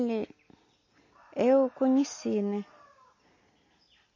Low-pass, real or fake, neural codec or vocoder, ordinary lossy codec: 7.2 kHz; real; none; MP3, 32 kbps